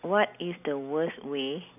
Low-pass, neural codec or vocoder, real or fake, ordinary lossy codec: 3.6 kHz; none; real; none